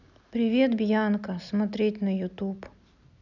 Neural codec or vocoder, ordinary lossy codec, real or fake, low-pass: none; none; real; 7.2 kHz